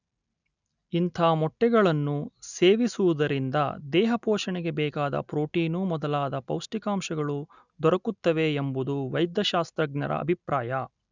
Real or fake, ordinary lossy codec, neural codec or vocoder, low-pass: real; none; none; 7.2 kHz